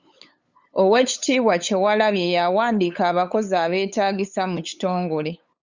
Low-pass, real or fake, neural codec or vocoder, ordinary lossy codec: 7.2 kHz; fake; codec, 16 kHz, 8 kbps, FunCodec, trained on LibriTTS, 25 frames a second; Opus, 64 kbps